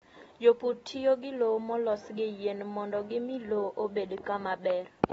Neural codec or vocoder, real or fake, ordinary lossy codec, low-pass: none; real; AAC, 24 kbps; 14.4 kHz